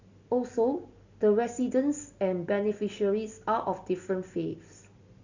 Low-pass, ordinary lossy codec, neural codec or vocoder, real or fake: 7.2 kHz; Opus, 64 kbps; none; real